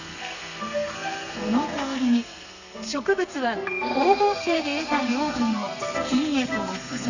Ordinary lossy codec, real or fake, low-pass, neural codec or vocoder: none; fake; 7.2 kHz; codec, 32 kHz, 1.9 kbps, SNAC